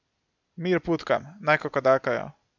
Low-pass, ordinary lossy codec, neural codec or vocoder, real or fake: 7.2 kHz; none; none; real